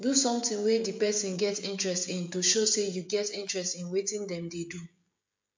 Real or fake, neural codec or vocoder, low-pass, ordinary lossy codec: fake; codec, 16 kHz, 16 kbps, FreqCodec, smaller model; 7.2 kHz; MP3, 64 kbps